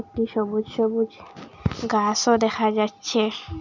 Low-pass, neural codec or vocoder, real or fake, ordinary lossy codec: 7.2 kHz; none; real; none